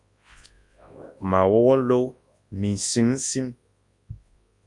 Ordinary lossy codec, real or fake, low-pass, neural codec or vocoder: Opus, 64 kbps; fake; 10.8 kHz; codec, 24 kHz, 0.9 kbps, WavTokenizer, large speech release